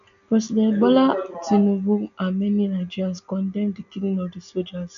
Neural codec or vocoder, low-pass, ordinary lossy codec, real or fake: none; 7.2 kHz; none; real